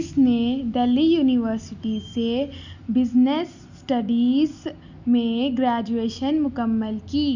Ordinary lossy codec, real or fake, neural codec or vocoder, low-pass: none; real; none; 7.2 kHz